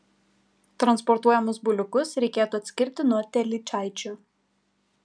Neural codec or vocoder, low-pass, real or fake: none; 9.9 kHz; real